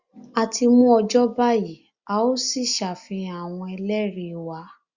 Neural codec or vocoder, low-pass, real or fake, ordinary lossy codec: none; 7.2 kHz; real; Opus, 64 kbps